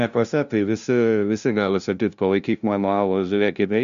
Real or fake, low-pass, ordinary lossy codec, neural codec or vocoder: fake; 7.2 kHz; MP3, 96 kbps; codec, 16 kHz, 0.5 kbps, FunCodec, trained on LibriTTS, 25 frames a second